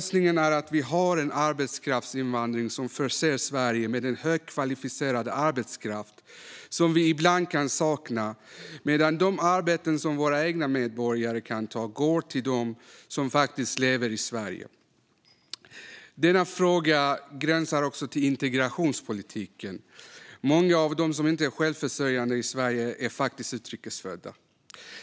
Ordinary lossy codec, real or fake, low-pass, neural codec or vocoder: none; real; none; none